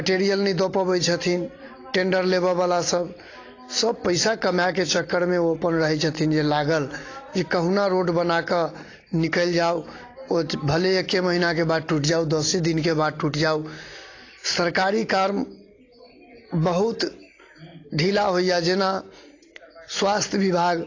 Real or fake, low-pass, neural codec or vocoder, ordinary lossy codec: real; 7.2 kHz; none; AAC, 32 kbps